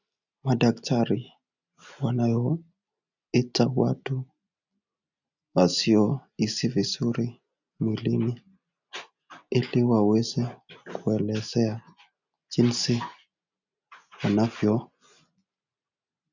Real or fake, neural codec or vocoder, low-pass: real; none; 7.2 kHz